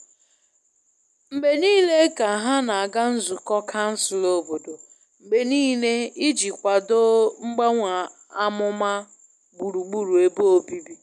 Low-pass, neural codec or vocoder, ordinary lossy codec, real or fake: none; none; none; real